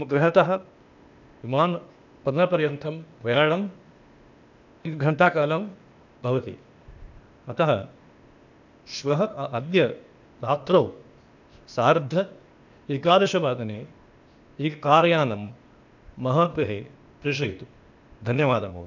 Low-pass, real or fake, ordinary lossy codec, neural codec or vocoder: 7.2 kHz; fake; none; codec, 16 kHz, 0.8 kbps, ZipCodec